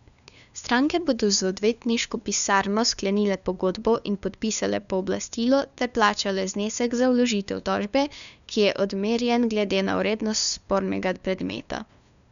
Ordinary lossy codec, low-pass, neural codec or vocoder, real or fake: none; 7.2 kHz; codec, 16 kHz, 2 kbps, FunCodec, trained on LibriTTS, 25 frames a second; fake